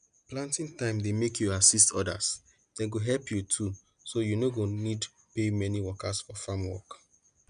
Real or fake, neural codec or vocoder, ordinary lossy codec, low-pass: real; none; none; 9.9 kHz